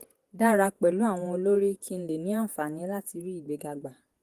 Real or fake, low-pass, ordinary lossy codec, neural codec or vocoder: fake; 14.4 kHz; Opus, 24 kbps; vocoder, 48 kHz, 128 mel bands, Vocos